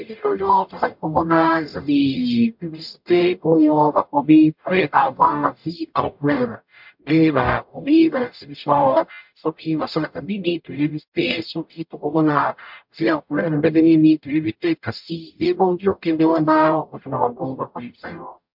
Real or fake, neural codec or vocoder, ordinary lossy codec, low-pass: fake; codec, 44.1 kHz, 0.9 kbps, DAC; MP3, 48 kbps; 5.4 kHz